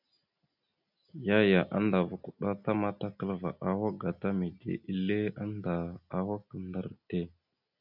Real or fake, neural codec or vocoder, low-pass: real; none; 5.4 kHz